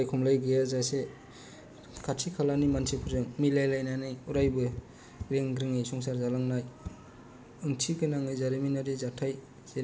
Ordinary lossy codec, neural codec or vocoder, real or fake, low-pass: none; none; real; none